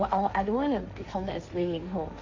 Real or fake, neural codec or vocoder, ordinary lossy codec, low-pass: fake; codec, 16 kHz, 1.1 kbps, Voila-Tokenizer; MP3, 64 kbps; 7.2 kHz